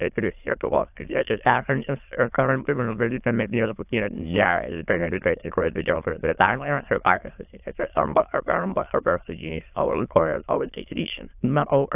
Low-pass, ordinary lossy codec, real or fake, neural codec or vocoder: 3.6 kHz; AAC, 32 kbps; fake; autoencoder, 22.05 kHz, a latent of 192 numbers a frame, VITS, trained on many speakers